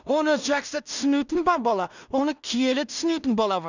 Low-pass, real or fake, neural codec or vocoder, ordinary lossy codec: 7.2 kHz; fake; codec, 16 kHz in and 24 kHz out, 0.4 kbps, LongCat-Audio-Codec, two codebook decoder; none